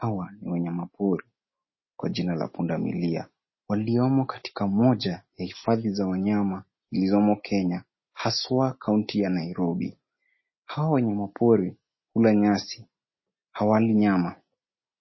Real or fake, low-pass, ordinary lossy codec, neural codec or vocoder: real; 7.2 kHz; MP3, 24 kbps; none